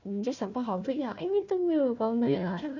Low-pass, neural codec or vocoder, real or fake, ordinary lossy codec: 7.2 kHz; codec, 16 kHz, 1 kbps, FunCodec, trained on Chinese and English, 50 frames a second; fake; none